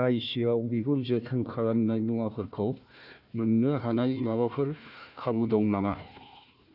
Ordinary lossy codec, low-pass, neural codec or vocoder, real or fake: none; 5.4 kHz; codec, 16 kHz, 1 kbps, FunCodec, trained on Chinese and English, 50 frames a second; fake